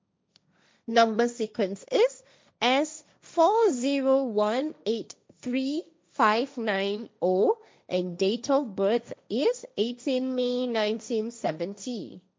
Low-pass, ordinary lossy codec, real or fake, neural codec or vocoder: none; none; fake; codec, 16 kHz, 1.1 kbps, Voila-Tokenizer